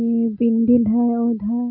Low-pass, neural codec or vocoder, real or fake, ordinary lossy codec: 5.4 kHz; none; real; none